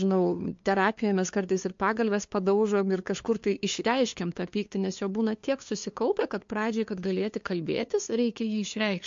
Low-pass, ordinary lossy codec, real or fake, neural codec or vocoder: 7.2 kHz; MP3, 48 kbps; fake; codec, 16 kHz, 2 kbps, FunCodec, trained on LibriTTS, 25 frames a second